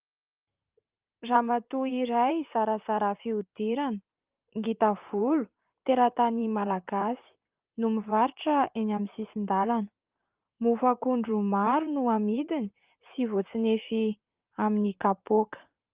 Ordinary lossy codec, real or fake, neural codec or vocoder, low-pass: Opus, 32 kbps; fake; vocoder, 44.1 kHz, 128 mel bands, Pupu-Vocoder; 3.6 kHz